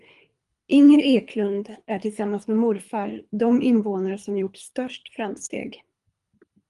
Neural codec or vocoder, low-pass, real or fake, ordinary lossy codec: codec, 24 kHz, 3 kbps, HILCodec; 10.8 kHz; fake; Opus, 32 kbps